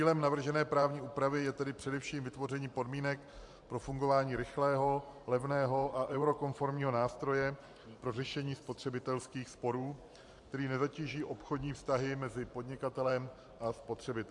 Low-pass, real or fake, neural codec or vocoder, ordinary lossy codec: 10.8 kHz; fake; vocoder, 44.1 kHz, 128 mel bands every 512 samples, BigVGAN v2; MP3, 64 kbps